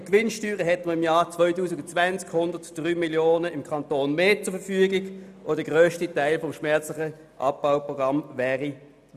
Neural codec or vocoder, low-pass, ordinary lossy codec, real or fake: none; 14.4 kHz; none; real